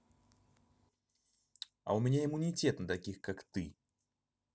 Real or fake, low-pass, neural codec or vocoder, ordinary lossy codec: real; none; none; none